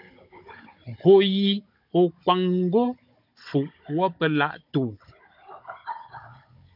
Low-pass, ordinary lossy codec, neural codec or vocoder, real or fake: 5.4 kHz; MP3, 48 kbps; codec, 16 kHz, 16 kbps, FunCodec, trained on Chinese and English, 50 frames a second; fake